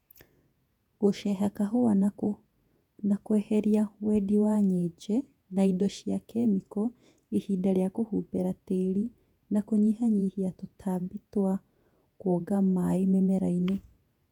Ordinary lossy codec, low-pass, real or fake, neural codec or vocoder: none; 19.8 kHz; fake; vocoder, 48 kHz, 128 mel bands, Vocos